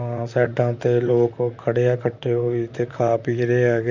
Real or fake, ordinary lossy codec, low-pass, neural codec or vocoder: fake; none; 7.2 kHz; vocoder, 44.1 kHz, 128 mel bands, Pupu-Vocoder